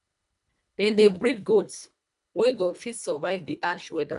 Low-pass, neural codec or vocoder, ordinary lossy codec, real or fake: 10.8 kHz; codec, 24 kHz, 1.5 kbps, HILCodec; none; fake